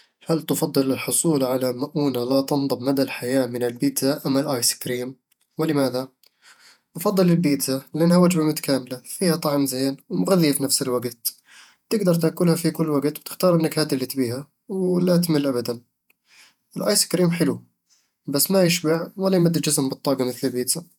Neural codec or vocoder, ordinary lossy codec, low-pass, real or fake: vocoder, 48 kHz, 128 mel bands, Vocos; none; 19.8 kHz; fake